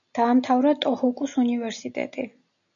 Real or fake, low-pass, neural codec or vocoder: real; 7.2 kHz; none